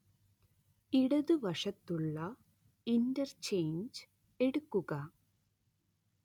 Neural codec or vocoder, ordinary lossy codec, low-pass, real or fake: none; none; 19.8 kHz; real